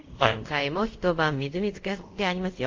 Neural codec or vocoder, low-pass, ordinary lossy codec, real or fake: codec, 24 kHz, 0.5 kbps, DualCodec; 7.2 kHz; Opus, 32 kbps; fake